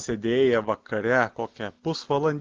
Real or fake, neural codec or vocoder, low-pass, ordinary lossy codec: real; none; 7.2 kHz; Opus, 16 kbps